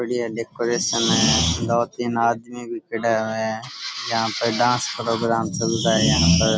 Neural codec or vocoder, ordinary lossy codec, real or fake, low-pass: none; none; real; none